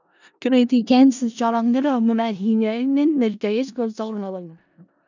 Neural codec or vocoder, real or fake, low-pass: codec, 16 kHz in and 24 kHz out, 0.4 kbps, LongCat-Audio-Codec, four codebook decoder; fake; 7.2 kHz